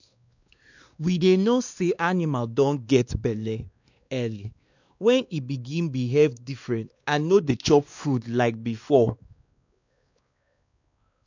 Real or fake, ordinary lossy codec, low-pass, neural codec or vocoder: fake; none; 7.2 kHz; codec, 16 kHz, 2 kbps, X-Codec, WavLM features, trained on Multilingual LibriSpeech